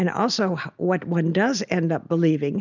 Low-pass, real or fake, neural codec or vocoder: 7.2 kHz; real; none